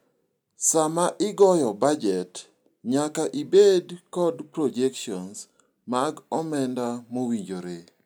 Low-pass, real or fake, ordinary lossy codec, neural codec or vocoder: none; real; none; none